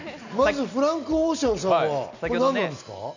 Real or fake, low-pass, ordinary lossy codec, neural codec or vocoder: real; 7.2 kHz; none; none